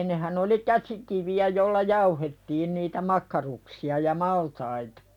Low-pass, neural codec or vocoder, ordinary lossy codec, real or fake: 19.8 kHz; none; none; real